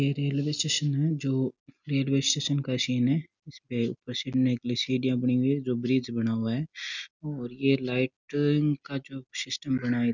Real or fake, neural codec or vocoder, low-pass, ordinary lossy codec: real; none; 7.2 kHz; none